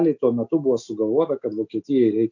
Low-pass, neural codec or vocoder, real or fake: 7.2 kHz; none; real